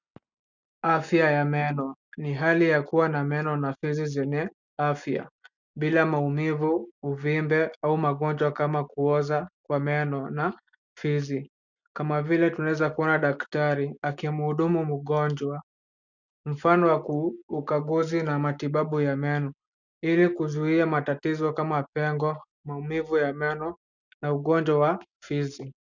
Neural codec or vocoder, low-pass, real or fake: none; 7.2 kHz; real